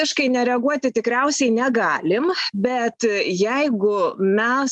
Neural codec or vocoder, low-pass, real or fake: none; 10.8 kHz; real